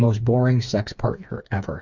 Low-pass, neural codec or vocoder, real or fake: 7.2 kHz; codec, 16 kHz, 4 kbps, FreqCodec, smaller model; fake